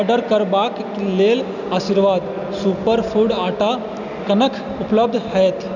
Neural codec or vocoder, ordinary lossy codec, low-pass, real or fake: none; none; 7.2 kHz; real